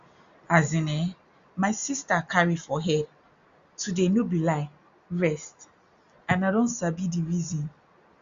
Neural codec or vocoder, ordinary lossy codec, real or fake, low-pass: none; Opus, 64 kbps; real; 7.2 kHz